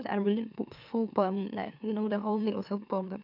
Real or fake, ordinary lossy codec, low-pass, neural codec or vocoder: fake; none; 5.4 kHz; autoencoder, 44.1 kHz, a latent of 192 numbers a frame, MeloTTS